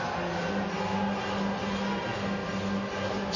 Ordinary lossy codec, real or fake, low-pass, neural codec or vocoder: none; real; 7.2 kHz; none